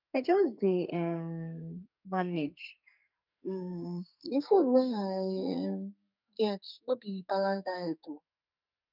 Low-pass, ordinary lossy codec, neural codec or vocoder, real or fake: 5.4 kHz; none; codec, 44.1 kHz, 2.6 kbps, SNAC; fake